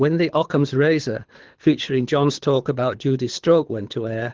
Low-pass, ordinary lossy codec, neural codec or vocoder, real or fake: 7.2 kHz; Opus, 16 kbps; codec, 24 kHz, 6 kbps, HILCodec; fake